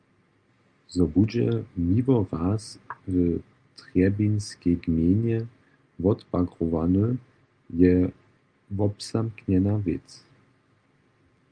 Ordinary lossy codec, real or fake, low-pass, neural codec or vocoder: Opus, 32 kbps; real; 9.9 kHz; none